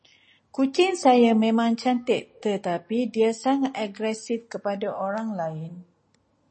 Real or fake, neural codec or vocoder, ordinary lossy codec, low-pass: real; none; MP3, 32 kbps; 10.8 kHz